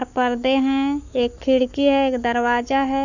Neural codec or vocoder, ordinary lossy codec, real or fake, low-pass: codec, 44.1 kHz, 7.8 kbps, Pupu-Codec; none; fake; 7.2 kHz